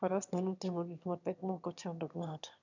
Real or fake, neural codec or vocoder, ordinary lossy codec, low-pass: fake; autoencoder, 22.05 kHz, a latent of 192 numbers a frame, VITS, trained on one speaker; none; 7.2 kHz